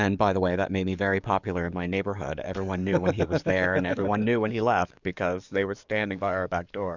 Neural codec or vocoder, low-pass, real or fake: codec, 44.1 kHz, 7.8 kbps, DAC; 7.2 kHz; fake